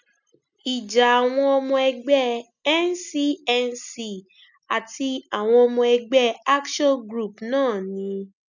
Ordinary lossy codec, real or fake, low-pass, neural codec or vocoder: none; real; 7.2 kHz; none